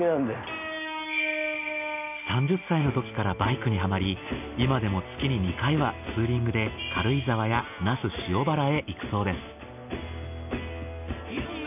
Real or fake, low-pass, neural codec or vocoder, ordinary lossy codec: real; 3.6 kHz; none; none